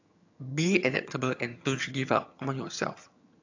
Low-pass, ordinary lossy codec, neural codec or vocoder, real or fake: 7.2 kHz; AAC, 48 kbps; vocoder, 22.05 kHz, 80 mel bands, HiFi-GAN; fake